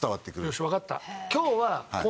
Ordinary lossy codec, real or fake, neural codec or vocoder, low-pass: none; real; none; none